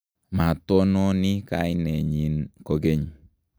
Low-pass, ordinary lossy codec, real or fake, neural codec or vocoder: none; none; real; none